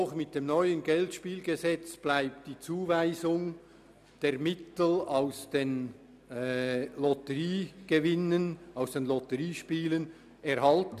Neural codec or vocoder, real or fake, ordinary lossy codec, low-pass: none; real; none; 14.4 kHz